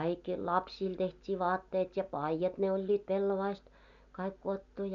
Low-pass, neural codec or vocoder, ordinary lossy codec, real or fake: 7.2 kHz; none; none; real